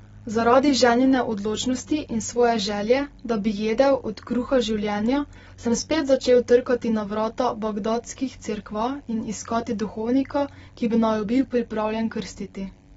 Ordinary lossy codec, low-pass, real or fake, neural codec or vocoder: AAC, 24 kbps; 19.8 kHz; real; none